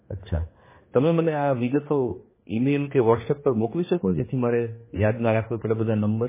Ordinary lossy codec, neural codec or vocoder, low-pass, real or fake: MP3, 16 kbps; codec, 16 kHz, 2 kbps, X-Codec, HuBERT features, trained on general audio; 3.6 kHz; fake